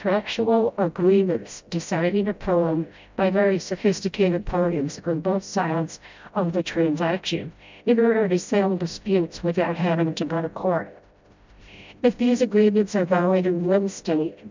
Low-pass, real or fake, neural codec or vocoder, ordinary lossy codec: 7.2 kHz; fake; codec, 16 kHz, 0.5 kbps, FreqCodec, smaller model; MP3, 64 kbps